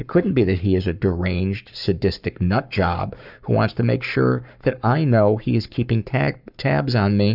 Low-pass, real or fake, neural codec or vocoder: 5.4 kHz; fake; codec, 44.1 kHz, 7.8 kbps, Pupu-Codec